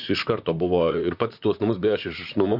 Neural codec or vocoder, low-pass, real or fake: vocoder, 44.1 kHz, 128 mel bands, Pupu-Vocoder; 5.4 kHz; fake